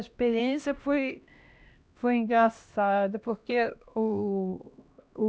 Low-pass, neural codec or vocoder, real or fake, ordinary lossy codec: none; codec, 16 kHz, 1 kbps, X-Codec, HuBERT features, trained on LibriSpeech; fake; none